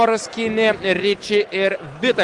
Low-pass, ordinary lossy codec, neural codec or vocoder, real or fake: 10.8 kHz; Opus, 24 kbps; none; real